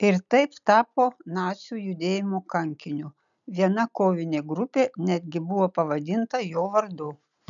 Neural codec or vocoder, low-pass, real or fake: none; 7.2 kHz; real